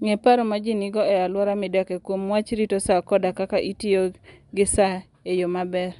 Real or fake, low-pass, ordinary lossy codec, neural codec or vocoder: real; 10.8 kHz; none; none